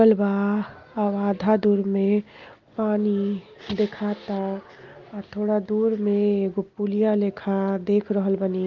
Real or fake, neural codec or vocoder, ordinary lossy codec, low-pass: real; none; Opus, 32 kbps; 7.2 kHz